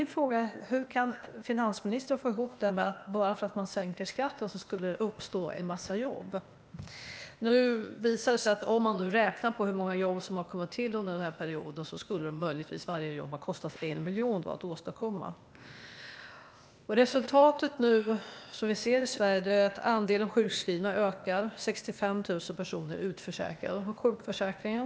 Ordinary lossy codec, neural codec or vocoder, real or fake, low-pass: none; codec, 16 kHz, 0.8 kbps, ZipCodec; fake; none